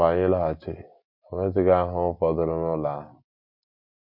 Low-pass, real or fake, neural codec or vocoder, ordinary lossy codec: 5.4 kHz; real; none; MP3, 32 kbps